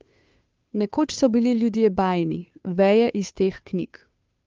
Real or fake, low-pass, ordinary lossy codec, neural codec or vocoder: fake; 7.2 kHz; Opus, 32 kbps; codec, 16 kHz, 2 kbps, FunCodec, trained on Chinese and English, 25 frames a second